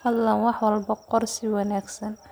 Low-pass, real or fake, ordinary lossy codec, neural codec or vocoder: none; real; none; none